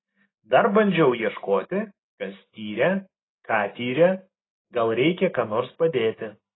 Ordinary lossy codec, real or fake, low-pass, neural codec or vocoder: AAC, 16 kbps; real; 7.2 kHz; none